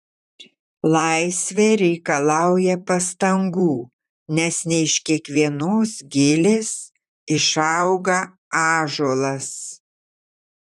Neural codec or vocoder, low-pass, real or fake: vocoder, 44.1 kHz, 128 mel bands, Pupu-Vocoder; 14.4 kHz; fake